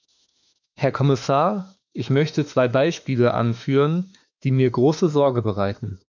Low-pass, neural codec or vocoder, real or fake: 7.2 kHz; autoencoder, 48 kHz, 32 numbers a frame, DAC-VAE, trained on Japanese speech; fake